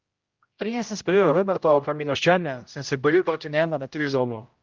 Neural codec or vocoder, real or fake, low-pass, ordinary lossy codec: codec, 16 kHz, 0.5 kbps, X-Codec, HuBERT features, trained on general audio; fake; 7.2 kHz; Opus, 32 kbps